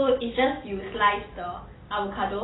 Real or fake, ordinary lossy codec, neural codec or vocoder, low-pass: real; AAC, 16 kbps; none; 7.2 kHz